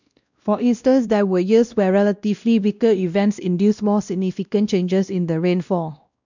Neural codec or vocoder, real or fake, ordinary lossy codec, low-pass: codec, 16 kHz, 1 kbps, X-Codec, WavLM features, trained on Multilingual LibriSpeech; fake; none; 7.2 kHz